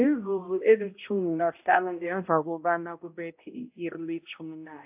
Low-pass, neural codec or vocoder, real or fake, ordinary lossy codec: 3.6 kHz; codec, 16 kHz, 0.5 kbps, X-Codec, HuBERT features, trained on balanced general audio; fake; AAC, 32 kbps